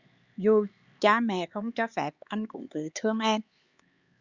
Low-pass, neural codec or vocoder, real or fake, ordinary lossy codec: 7.2 kHz; codec, 16 kHz, 4 kbps, X-Codec, HuBERT features, trained on LibriSpeech; fake; Opus, 64 kbps